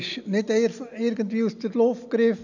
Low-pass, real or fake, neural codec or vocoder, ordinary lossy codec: 7.2 kHz; real; none; none